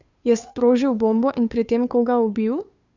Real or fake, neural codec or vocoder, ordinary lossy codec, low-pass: fake; codec, 16 kHz, 2 kbps, FunCodec, trained on Chinese and English, 25 frames a second; Opus, 64 kbps; 7.2 kHz